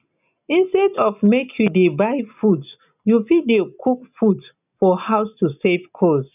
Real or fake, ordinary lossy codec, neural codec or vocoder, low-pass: real; none; none; 3.6 kHz